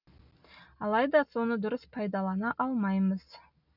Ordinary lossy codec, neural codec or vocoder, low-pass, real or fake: none; none; 5.4 kHz; real